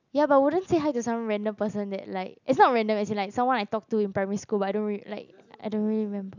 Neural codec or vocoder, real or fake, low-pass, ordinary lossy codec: none; real; 7.2 kHz; none